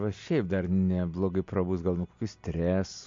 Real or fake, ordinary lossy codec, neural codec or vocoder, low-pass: real; MP3, 48 kbps; none; 7.2 kHz